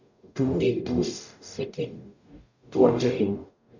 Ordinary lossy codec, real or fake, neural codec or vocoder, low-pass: none; fake; codec, 44.1 kHz, 0.9 kbps, DAC; 7.2 kHz